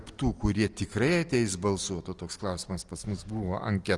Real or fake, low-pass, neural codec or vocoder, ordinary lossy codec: real; 10.8 kHz; none; Opus, 24 kbps